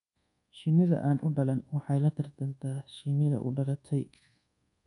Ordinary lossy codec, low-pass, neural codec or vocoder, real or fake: none; 10.8 kHz; codec, 24 kHz, 1.2 kbps, DualCodec; fake